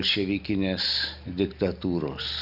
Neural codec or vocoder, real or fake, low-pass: none; real; 5.4 kHz